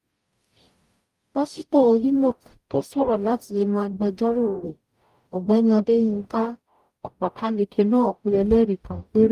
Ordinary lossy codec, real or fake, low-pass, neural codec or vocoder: Opus, 32 kbps; fake; 14.4 kHz; codec, 44.1 kHz, 0.9 kbps, DAC